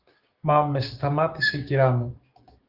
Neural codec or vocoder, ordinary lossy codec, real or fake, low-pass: codec, 16 kHz, 6 kbps, DAC; Opus, 32 kbps; fake; 5.4 kHz